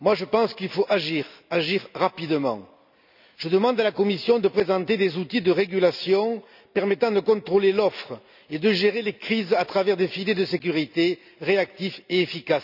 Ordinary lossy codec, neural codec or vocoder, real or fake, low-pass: none; none; real; 5.4 kHz